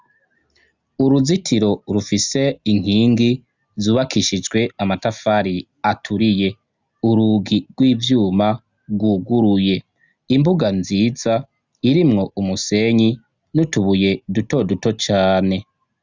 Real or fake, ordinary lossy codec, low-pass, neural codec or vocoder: real; Opus, 64 kbps; 7.2 kHz; none